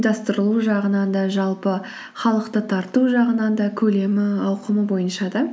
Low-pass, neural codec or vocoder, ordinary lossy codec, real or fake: none; none; none; real